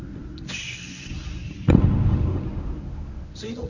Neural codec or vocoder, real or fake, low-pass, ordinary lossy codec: codec, 24 kHz, 0.9 kbps, WavTokenizer, medium speech release version 1; fake; 7.2 kHz; none